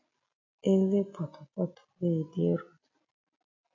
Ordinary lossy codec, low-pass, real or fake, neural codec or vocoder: AAC, 48 kbps; 7.2 kHz; real; none